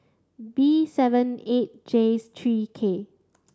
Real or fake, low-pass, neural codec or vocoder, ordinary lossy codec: real; none; none; none